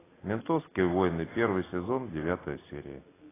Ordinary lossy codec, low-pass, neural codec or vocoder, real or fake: AAC, 16 kbps; 3.6 kHz; none; real